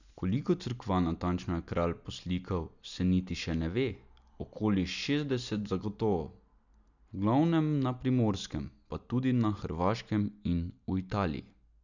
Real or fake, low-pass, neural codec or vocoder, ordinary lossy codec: real; 7.2 kHz; none; none